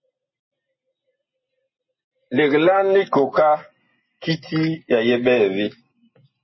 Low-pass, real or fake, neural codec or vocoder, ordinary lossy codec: 7.2 kHz; real; none; MP3, 24 kbps